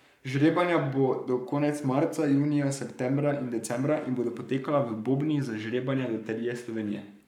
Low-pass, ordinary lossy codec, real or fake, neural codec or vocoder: 19.8 kHz; none; fake; codec, 44.1 kHz, 7.8 kbps, Pupu-Codec